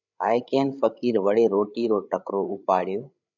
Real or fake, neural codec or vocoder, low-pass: fake; codec, 16 kHz, 8 kbps, FreqCodec, larger model; 7.2 kHz